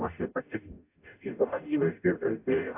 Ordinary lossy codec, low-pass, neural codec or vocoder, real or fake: AAC, 32 kbps; 3.6 kHz; codec, 44.1 kHz, 0.9 kbps, DAC; fake